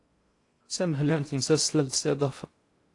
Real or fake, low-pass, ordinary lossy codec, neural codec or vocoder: fake; 10.8 kHz; AAC, 48 kbps; codec, 16 kHz in and 24 kHz out, 0.6 kbps, FocalCodec, streaming, 4096 codes